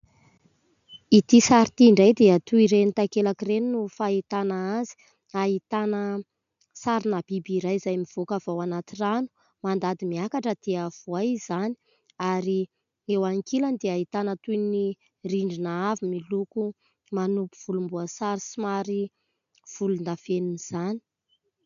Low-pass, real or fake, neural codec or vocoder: 7.2 kHz; real; none